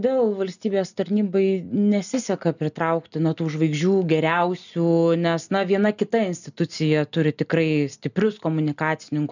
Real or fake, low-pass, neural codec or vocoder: real; 7.2 kHz; none